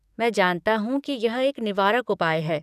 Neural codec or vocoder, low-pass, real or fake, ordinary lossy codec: codec, 44.1 kHz, 7.8 kbps, DAC; 14.4 kHz; fake; none